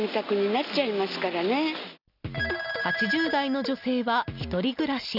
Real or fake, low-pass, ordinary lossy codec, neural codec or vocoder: real; 5.4 kHz; none; none